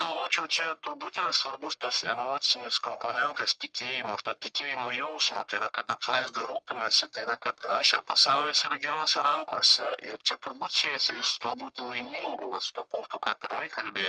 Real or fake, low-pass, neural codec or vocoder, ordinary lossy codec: fake; 9.9 kHz; codec, 44.1 kHz, 1.7 kbps, Pupu-Codec; Opus, 64 kbps